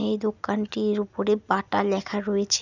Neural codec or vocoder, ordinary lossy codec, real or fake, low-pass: none; none; real; 7.2 kHz